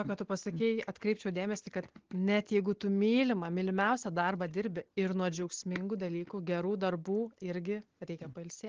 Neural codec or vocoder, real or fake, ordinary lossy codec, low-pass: none; real; Opus, 16 kbps; 7.2 kHz